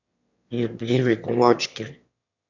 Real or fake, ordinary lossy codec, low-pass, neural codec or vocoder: fake; none; 7.2 kHz; autoencoder, 22.05 kHz, a latent of 192 numbers a frame, VITS, trained on one speaker